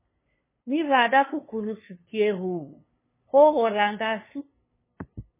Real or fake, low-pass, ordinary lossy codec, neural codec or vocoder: fake; 3.6 kHz; MP3, 16 kbps; codec, 16 kHz, 2 kbps, FunCodec, trained on LibriTTS, 25 frames a second